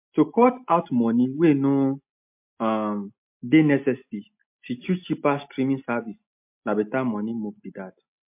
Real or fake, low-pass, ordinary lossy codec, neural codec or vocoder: real; 3.6 kHz; MP3, 32 kbps; none